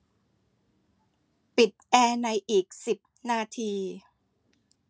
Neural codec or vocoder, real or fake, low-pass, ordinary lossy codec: none; real; none; none